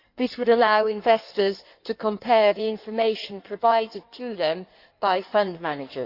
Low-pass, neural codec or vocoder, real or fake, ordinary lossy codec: 5.4 kHz; codec, 16 kHz in and 24 kHz out, 1.1 kbps, FireRedTTS-2 codec; fake; none